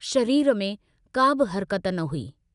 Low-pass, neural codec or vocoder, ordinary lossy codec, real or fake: 10.8 kHz; none; none; real